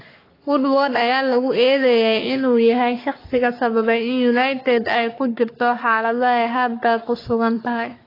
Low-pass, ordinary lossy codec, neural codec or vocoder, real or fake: 5.4 kHz; AAC, 24 kbps; codec, 44.1 kHz, 3.4 kbps, Pupu-Codec; fake